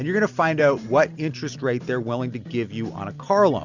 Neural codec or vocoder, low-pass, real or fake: none; 7.2 kHz; real